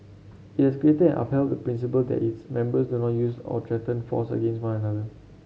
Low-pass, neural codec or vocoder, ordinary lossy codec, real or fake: none; none; none; real